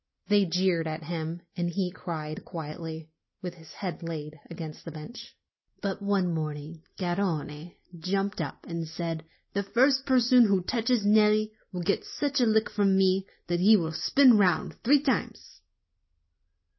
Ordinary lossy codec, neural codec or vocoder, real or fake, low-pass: MP3, 24 kbps; none; real; 7.2 kHz